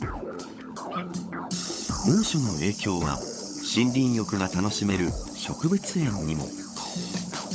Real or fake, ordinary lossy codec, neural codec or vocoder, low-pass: fake; none; codec, 16 kHz, 16 kbps, FunCodec, trained on LibriTTS, 50 frames a second; none